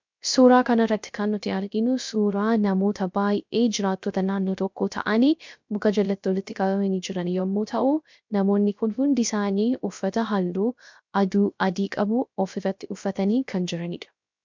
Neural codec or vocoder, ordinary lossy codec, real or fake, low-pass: codec, 16 kHz, 0.3 kbps, FocalCodec; MP3, 64 kbps; fake; 7.2 kHz